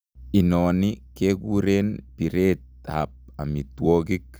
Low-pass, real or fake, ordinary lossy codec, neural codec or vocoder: none; real; none; none